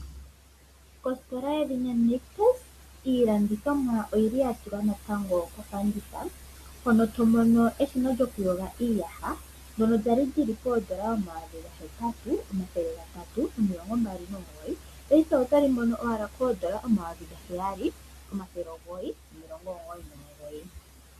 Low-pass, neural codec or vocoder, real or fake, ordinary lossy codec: 14.4 kHz; none; real; AAC, 64 kbps